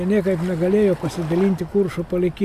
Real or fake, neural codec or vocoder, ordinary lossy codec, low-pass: real; none; AAC, 96 kbps; 14.4 kHz